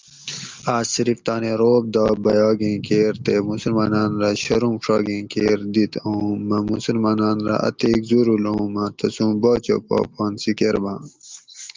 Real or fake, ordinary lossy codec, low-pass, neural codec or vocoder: real; Opus, 32 kbps; 7.2 kHz; none